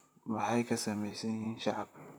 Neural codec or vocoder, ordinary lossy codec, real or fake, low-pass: vocoder, 44.1 kHz, 128 mel bands, Pupu-Vocoder; none; fake; none